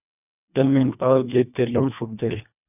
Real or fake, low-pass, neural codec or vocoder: fake; 3.6 kHz; codec, 24 kHz, 1.5 kbps, HILCodec